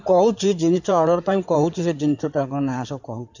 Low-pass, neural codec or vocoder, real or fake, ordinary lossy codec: 7.2 kHz; codec, 16 kHz in and 24 kHz out, 2.2 kbps, FireRedTTS-2 codec; fake; none